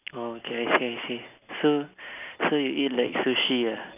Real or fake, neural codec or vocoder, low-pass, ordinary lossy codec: real; none; 3.6 kHz; none